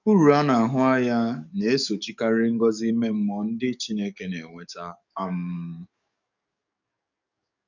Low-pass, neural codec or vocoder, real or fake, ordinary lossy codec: 7.2 kHz; codec, 44.1 kHz, 7.8 kbps, DAC; fake; none